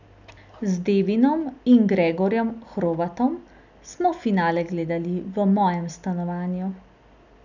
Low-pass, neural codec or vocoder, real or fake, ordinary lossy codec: 7.2 kHz; none; real; none